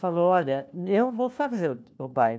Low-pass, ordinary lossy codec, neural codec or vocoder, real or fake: none; none; codec, 16 kHz, 1 kbps, FunCodec, trained on LibriTTS, 50 frames a second; fake